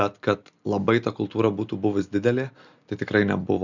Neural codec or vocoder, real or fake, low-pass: none; real; 7.2 kHz